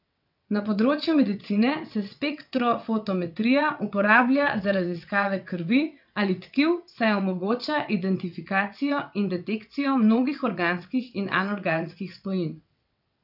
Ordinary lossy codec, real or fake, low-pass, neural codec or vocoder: none; fake; 5.4 kHz; vocoder, 22.05 kHz, 80 mel bands, WaveNeXt